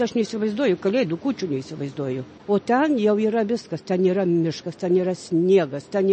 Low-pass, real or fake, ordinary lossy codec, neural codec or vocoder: 10.8 kHz; real; MP3, 32 kbps; none